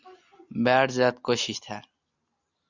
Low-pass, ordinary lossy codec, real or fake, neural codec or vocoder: 7.2 kHz; Opus, 64 kbps; real; none